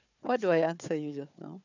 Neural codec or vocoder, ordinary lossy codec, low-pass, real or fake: codec, 16 kHz, 4 kbps, FunCodec, trained on Chinese and English, 50 frames a second; none; 7.2 kHz; fake